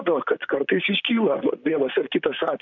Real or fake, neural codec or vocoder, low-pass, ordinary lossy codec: fake; vocoder, 44.1 kHz, 128 mel bands, Pupu-Vocoder; 7.2 kHz; MP3, 64 kbps